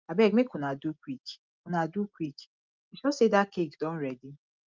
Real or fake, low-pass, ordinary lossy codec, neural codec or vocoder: real; 7.2 kHz; Opus, 32 kbps; none